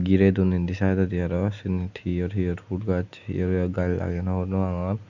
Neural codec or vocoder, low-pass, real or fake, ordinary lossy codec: none; 7.2 kHz; real; none